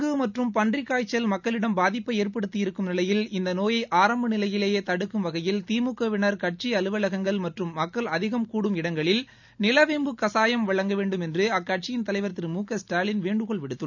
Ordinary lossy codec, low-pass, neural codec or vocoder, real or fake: none; 7.2 kHz; none; real